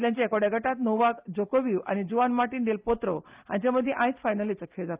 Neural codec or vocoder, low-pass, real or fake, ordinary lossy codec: none; 3.6 kHz; real; Opus, 24 kbps